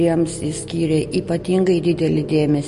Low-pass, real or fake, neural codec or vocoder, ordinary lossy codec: 14.4 kHz; real; none; MP3, 48 kbps